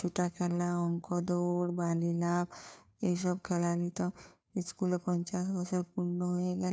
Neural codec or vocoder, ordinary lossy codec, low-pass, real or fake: codec, 16 kHz, 2 kbps, FunCodec, trained on Chinese and English, 25 frames a second; none; none; fake